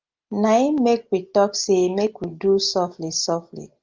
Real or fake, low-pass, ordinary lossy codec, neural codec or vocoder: real; 7.2 kHz; Opus, 32 kbps; none